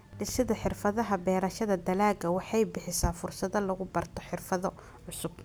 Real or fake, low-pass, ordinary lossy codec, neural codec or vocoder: real; none; none; none